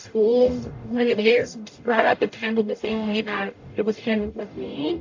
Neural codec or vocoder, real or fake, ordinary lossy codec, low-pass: codec, 44.1 kHz, 0.9 kbps, DAC; fake; AAC, 48 kbps; 7.2 kHz